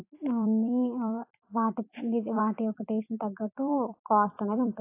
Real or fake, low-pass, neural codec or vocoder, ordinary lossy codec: fake; 3.6 kHz; vocoder, 44.1 kHz, 128 mel bands every 256 samples, BigVGAN v2; AAC, 24 kbps